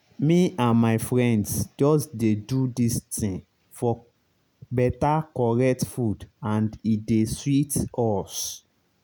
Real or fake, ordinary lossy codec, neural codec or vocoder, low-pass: real; none; none; none